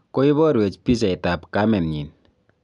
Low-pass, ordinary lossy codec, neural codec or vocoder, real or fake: 10.8 kHz; MP3, 96 kbps; none; real